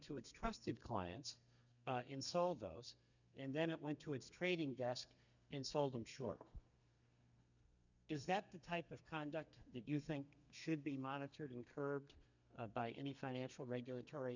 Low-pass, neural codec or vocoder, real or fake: 7.2 kHz; codec, 32 kHz, 1.9 kbps, SNAC; fake